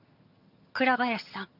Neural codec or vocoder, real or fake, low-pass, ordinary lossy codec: vocoder, 22.05 kHz, 80 mel bands, HiFi-GAN; fake; 5.4 kHz; none